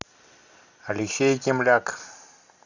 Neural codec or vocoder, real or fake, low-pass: none; real; 7.2 kHz